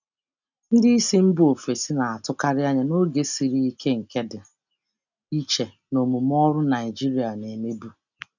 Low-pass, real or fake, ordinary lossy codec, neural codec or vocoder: 7.2 kHz; real; none; none